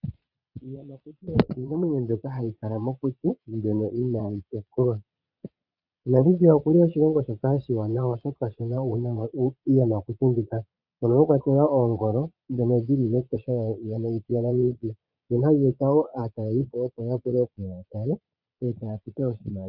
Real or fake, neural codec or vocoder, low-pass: fake; vocoder, 22.05 kHz, 80 mel bands, Vocos; 5.4 kHz